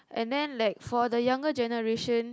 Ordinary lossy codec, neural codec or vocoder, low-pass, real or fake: none; none; none; real